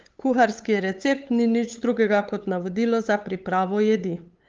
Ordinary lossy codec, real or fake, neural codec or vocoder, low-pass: Opus, 32 kbps; fake; codec, 16 kHz, 8 kbps, FunCodec, trained on LibriTTS, 25 frames a second; 7.2 kHz